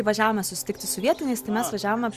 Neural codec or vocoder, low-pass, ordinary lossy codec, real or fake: none; 14.4 kHz; Opus, 64 kbps; real